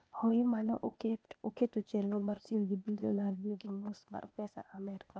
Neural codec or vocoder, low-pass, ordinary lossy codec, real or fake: codec, 16 kHz, 0.8 kbps, ZipCodec; none; none; fake